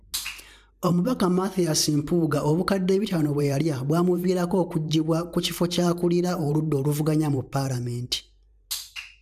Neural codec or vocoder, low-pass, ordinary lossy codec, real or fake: vocoder, 44.1 kHz, 128 mel bands every 512 samples, BigVGAN v2; 14.4 kHz; none; fake